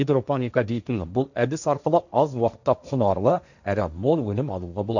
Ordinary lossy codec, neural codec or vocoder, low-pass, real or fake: none; codec, 16 kHz, 1.1 kbps, Voila-Tokenizer; 7.2 kHz; fake